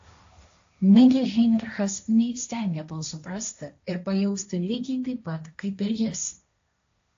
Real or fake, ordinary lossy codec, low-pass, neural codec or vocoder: fake; AAC, 48 kbps; 7.2 kHz; codec, 16 kHz, 1.1 kbps, Voila-Tokenizer